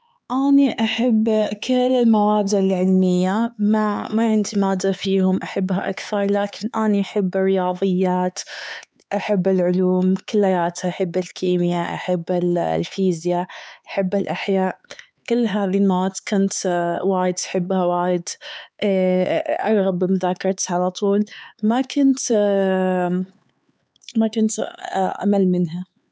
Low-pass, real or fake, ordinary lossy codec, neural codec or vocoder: none; fake; none; codec, 16 kHz, 4 kbps, X-Codec, HuBERT features, trained on LibriSpeech